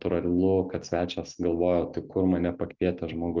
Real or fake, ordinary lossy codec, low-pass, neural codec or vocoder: real; Opus, 24 kbps; 7.2 kHz; none